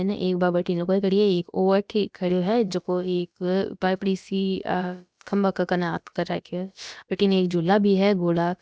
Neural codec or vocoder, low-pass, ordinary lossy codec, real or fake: codec, 16 kHz, about 1 kbps, DyCAST, with the encoder's durations; none; none; fake